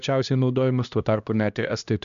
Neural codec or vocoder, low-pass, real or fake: codec, 16 kHz, 1 kbps, X-Codec, HuBERT features, trained on LibriSpeech; 7.2 kHz; fake